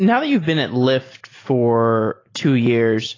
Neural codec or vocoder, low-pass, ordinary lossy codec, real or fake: none; 7.2 kHz; AAC, 32 kbps; real